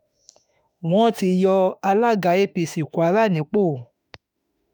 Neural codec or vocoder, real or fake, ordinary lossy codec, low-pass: autoencoder, 48 kHz, 32 numbers a frame, DAC-VAE, trained on Japanese speech; fake; none; none